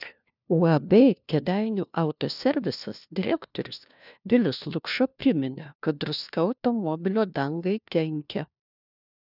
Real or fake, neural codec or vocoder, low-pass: fake; codec, 16 kHz, 1 kbps, FunCodec, trained on LibriTTS, 50 frames a second; 5.4 kHz